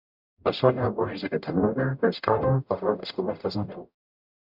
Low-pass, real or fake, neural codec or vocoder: 5.4 kHz; fake; codec, 44.1 kHz, 0.9 kbps, DAC